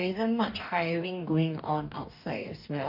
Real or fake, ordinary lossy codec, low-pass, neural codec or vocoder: fake; none; 5.4 kHz; codec, 44.1 kHz, 2.6 kbps, DAC